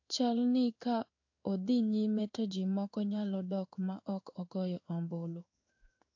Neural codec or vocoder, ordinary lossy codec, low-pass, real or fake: codec, 16 kHz in and 24 kHz out, 1 kbps, XY-Tokenizer; AAC, 48 kbps; 7.2 kHz; fake